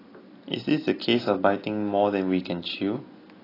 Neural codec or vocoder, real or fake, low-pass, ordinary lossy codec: none; real; 5.4 kHz; AAC, 24 kbps